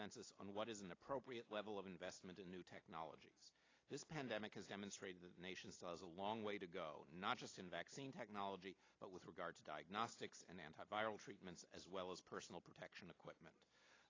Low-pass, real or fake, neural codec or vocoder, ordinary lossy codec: 7.2 kHz; real; none; AAC, 32 kbps